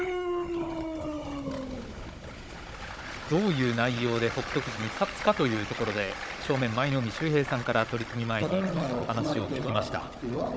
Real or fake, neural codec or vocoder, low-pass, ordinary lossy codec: fake; codec, 16 kHz, 16 kbps, FunCodec, trained on Chinese and English, 50 frames a second; none; none